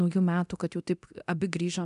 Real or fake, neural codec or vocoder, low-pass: fake; codec, 24 kHz, 0.9 kbps, DualCodec; 10.8 kHz